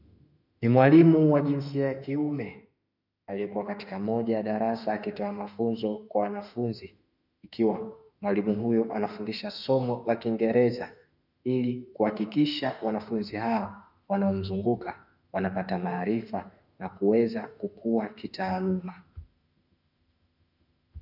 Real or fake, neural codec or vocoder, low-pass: fake; autoencoder, 48 kHz, 32 numbers a frame, DAC-VAE, trained on Japanese speech; 5.4 kHz